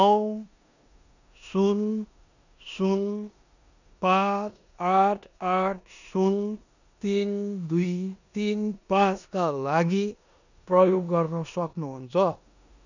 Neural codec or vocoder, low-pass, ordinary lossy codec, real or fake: codec, 16 kHz in and 24 kHz out, 0.9 kbps, LongCat-Audio-Codec, four codebook decoder; 7.2 kHz; none; fake